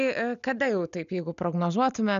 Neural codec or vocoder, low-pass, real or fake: none; 7.2 kHz; real